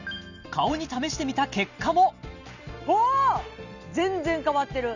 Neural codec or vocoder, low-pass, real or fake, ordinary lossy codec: none; 7.2 kHz; real; none